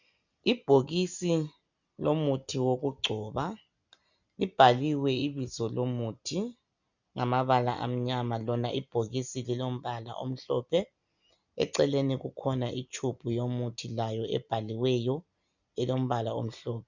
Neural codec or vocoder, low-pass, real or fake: none; 7.2 kHz; real